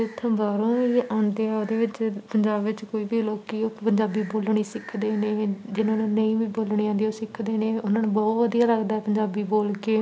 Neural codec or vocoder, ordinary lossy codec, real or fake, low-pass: none; none; real; none